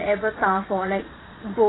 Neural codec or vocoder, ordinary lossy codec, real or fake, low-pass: vocoder, 22.05 kHz, 80 mel bands, Vocos; AAC, 16 kbps; fake; 7.2 kHz